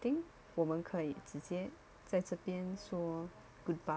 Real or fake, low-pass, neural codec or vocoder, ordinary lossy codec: real; none; none; none